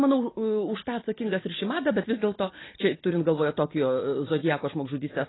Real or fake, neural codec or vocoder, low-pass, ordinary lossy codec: real; none; 7.2 kHz; AAC, 16 kbps